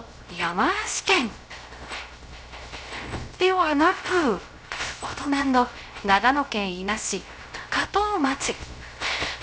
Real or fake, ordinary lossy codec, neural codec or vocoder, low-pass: fake; none; codec, 16 kHz, 0.3 kbps, FocalCodec; none